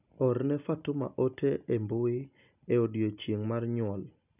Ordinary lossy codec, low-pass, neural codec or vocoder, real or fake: none; 3.6 kHz; none; real